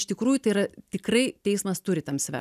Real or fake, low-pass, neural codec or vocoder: real; 14.4 kHz; none